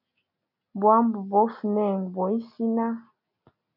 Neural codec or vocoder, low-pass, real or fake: none; 5.4 kHz; real